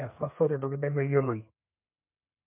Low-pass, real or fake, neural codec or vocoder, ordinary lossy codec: 3.6 kHz; fake; codec, 32 kHz, 1.9 kbps, SNAC; MP3, 32 kbps